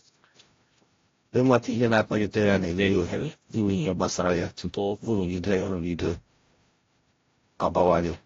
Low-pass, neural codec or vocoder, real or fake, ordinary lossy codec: 7.2 kHz; codec, 16 kHz, 0.5 kbps, FreqCodec, larger model; fake; AAC, 32 kbps